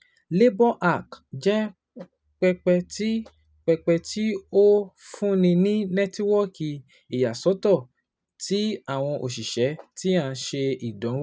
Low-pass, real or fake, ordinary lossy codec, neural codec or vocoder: none; real; none; none